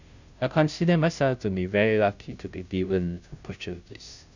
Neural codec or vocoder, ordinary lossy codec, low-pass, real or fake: codec, 16 kHz, 0.5 kbps, FunCodec, trained on Chinese and English, 25 frames a second; none; 7.2 kHz; fake